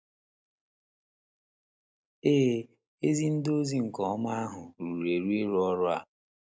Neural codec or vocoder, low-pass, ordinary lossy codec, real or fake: none; none; none; real